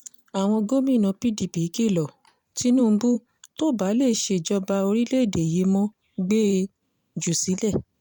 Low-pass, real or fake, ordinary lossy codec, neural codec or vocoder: 19.8 kHz; fake; MP3, 96 kbps; vocoder, 44.1 kHz, 128 mel bands every 512 samples, BigVGAN v2